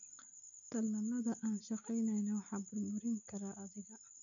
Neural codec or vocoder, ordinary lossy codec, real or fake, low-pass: none; none; real; 7.2 kHz